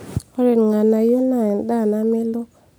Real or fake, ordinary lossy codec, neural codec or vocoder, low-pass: real; none; none; none